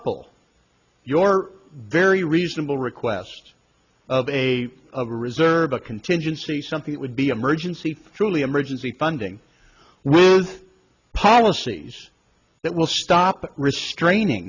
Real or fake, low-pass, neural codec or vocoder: real; 7.2 kHz; none